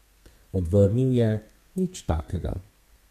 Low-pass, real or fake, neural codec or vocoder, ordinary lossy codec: 14.4 kHz; fake; codec, 32 kHz, 1.9 kbps, SNAC; MP3, 96 kbps